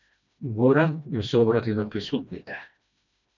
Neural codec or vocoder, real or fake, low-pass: codec, 16 kHz, 1 kbps, FreqCodec, smaller model; fake; 7.2 kHz